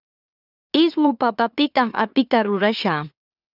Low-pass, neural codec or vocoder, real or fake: 5.4 kHz; autoencoder, 44.1 kHz, a latent of 192 numbers a frame, MeloTTS; fake